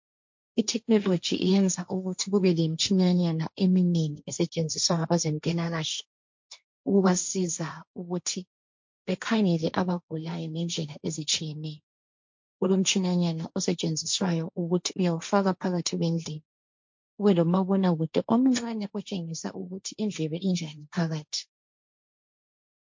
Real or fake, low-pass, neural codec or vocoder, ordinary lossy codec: fake; 7.2 kHz; codec, 16 kHz, 1.1 kbps, Voila-Tokenizer; MP3, 48 kbps